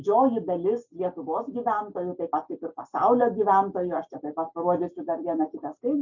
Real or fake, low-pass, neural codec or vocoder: real; 7.2 kHz; none